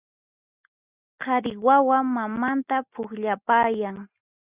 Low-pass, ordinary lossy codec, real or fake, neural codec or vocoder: 3.6 kHz; Opus, 64 kbps; real; none